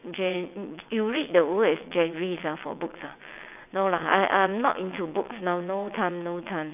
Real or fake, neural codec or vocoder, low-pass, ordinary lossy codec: fake; vocoder, 22.05 kHz, 80 mel bands, WaveNeXt; 3.6 kHz; none